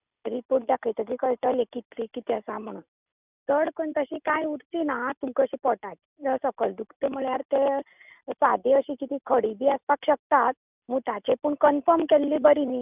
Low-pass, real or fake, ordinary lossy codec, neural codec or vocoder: 3.6 kHz; real; none; none